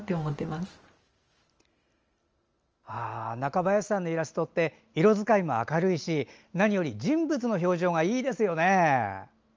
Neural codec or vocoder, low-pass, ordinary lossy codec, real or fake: none; 7.2 kHz; Opus, 24 kbps; real